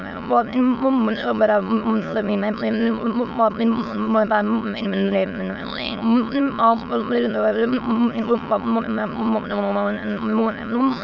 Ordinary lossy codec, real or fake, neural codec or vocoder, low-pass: none; fake; autoencoder, 22.05 kHz, a latent of 192 numbers a frame, VITS, trained on many speakers; 7.2 kHz